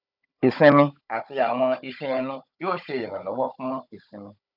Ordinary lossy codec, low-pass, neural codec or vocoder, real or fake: none; 5.4 kHz; codec, 16 kHz, 16 kbps, FunCodec, trained on Chinese and English, 50 frames a second; fake